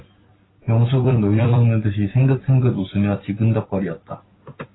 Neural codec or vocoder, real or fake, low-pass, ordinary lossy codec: vocoder, 44.1 kHz, 128 mel bands every 256 samples, BigVGAN v2; fake; 7.2 kHz; AAC, 16 kbps